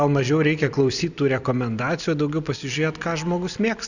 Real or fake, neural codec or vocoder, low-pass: real; none; 7.2 kHz